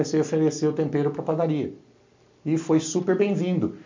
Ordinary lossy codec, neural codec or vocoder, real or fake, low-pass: MP3, 48 kbps; none; real; 7.2 kHz